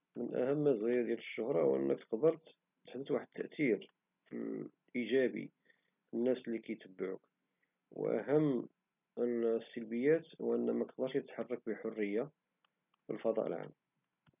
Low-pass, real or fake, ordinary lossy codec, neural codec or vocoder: 3.6 kHz; real; none; none